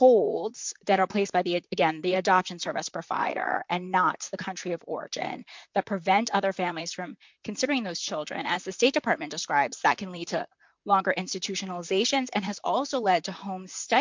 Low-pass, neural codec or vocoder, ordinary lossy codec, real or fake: 7.2 kHz; vocoder, 44.1 kHz, 128 mel bands, Pupu-Vocoder; MP3, 64 kbps; fake